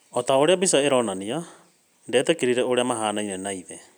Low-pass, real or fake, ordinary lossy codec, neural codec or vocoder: none; real; none; none